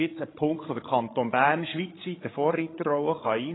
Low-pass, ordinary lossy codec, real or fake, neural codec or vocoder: 7.2 kHz; AAC, 16 kbps; fake; codec, 16 kHz, 16 kbps, FreqCodec, larger model